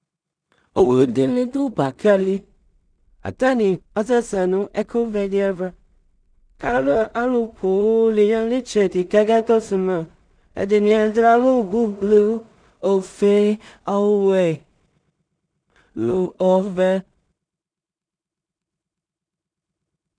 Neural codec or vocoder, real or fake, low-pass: codec, 16 kHz in and 24 kHz out, 0.4 kbps, LongCat-Audio-Codec, two codebook decoder; fake; 9.9 kHz